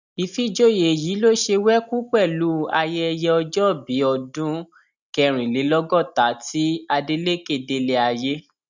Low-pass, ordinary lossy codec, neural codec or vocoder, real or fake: 7.2 kHz; none; none; real